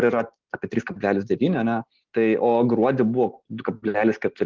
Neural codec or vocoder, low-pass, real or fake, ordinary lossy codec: none; 7.2 kHz; real; Opus, 16 kbps